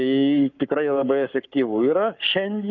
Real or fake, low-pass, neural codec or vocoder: fake; 7.2 kHz; codec, 44.1 kHz, 7.8 kbps, Pupu-Codec